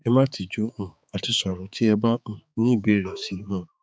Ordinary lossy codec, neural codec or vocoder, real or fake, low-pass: none; codec, 16 kHz, 4 kbps, X-Codec, HuBERT features, trained on balanced general audio; fake; none